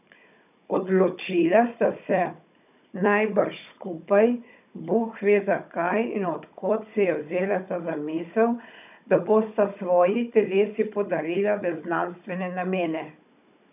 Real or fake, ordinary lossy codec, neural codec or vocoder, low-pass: fake; none; codec, 16 kHz, 16 kbps, FunCodec, trained on Chinese and English, 50 frames a second; 3.6 kHz